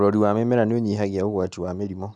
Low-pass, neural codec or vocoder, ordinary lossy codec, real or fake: 9.9 kHz; none; none; real